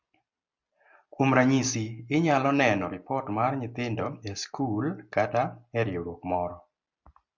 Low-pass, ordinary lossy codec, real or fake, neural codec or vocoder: 7.2 kHz; MP3, 64 kbps; real; none